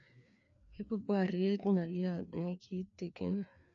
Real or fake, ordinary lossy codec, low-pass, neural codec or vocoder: fake; AAC, 64 kbps; 7.2 kHz; codec, 16 kHz, 2 kbps, FreqCodec, larger model